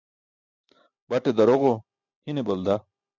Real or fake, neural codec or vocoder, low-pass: real; none; 7.2 kHz